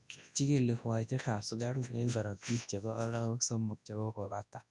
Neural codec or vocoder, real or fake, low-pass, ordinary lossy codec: codec, 24 kHz, 0.9 kbps, WavTokenizer, large speech release; fake; 10.8 kHz; none